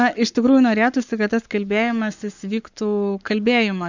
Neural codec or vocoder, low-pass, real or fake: codec, 44.1 kHz, 7.8 kbps, Pupu-Codec; 7.2 kHz; fake